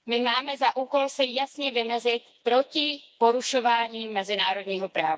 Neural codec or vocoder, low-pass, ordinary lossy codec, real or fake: codec, 16 kHz, 2 kbps, FreqCodec, smaller model; none; none; fake